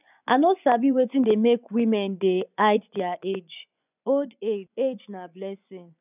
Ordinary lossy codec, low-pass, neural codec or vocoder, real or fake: none; 3.6 kHz; vocoder, 24 kHz, 100 mel bands, Vocos; fake